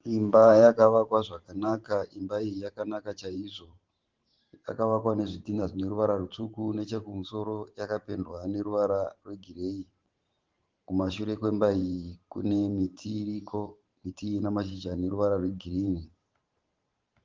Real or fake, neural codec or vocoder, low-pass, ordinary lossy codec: fake; vocoder, 44.1 kHz, 128 mel bands every 512 samples, BigVGAN v2; 7.2 kHz; Opus, 16 kbps